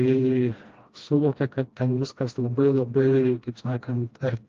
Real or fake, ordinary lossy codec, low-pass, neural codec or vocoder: fake; Opus, 32 kbps; 7.2 kHz; codec, 16 kHz, 1 kbps, FreqCodec, smaller model